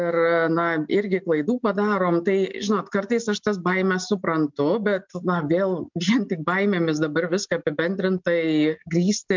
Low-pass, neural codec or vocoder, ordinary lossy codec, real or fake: 7.2 kHz; none; MP3, 64 kbps; real